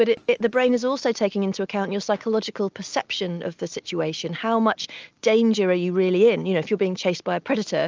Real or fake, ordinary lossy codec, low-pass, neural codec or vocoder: real; Opus, 24 kbps; 7.2 kHz; none